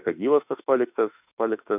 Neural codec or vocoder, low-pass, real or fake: autoencoder, 48 kHz, 32 numbers a frame, DAC-VAE, trained on Japanese speech; 3.6 kHz; fake